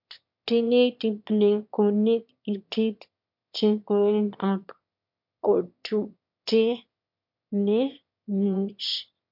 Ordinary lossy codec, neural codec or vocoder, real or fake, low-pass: MP3, 48 kbps; autoencoder, 22.05 kHz, a latent of 192 numbers a frame, VITS, trained on one speaker; fake; 5.4 kHz